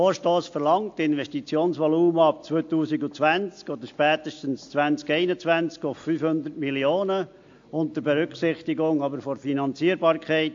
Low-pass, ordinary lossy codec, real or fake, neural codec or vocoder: 7.2 kHz; AAC, 48 kbps; real; none